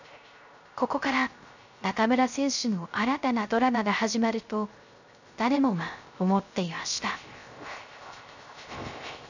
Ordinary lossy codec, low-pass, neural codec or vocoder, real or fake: none; 7.2 kHz; codec, 16 kHz, 0.3 kbps, FocalCodec; fake